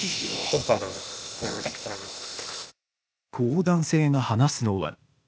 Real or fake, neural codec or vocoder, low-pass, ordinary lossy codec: fake; codec, 16 kHz, 0.8 kbps, ZipCodec; none; none